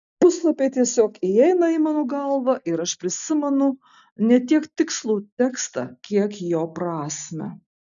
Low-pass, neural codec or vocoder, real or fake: 7.2 kHz; none; real